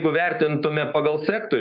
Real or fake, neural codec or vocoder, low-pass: fake; codec, 16 kHz, 6 kbps, DAC; 5.4 kHz